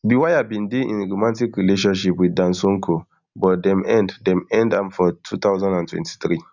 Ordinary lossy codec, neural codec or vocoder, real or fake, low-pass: none; none; real; 7.2 kHz